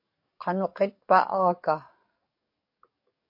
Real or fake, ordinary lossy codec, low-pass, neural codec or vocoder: fake; MP3, 32 kbps; 5.4 kHz; codec, 44.1 kHz, 7.8 kbps, DAC